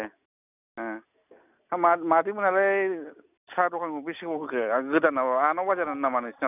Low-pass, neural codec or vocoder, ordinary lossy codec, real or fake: 3.6 kHz; none; none; real